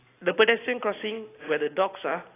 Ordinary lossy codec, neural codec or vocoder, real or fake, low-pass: AAC, 16 kbps; none; real; 3.6 kHz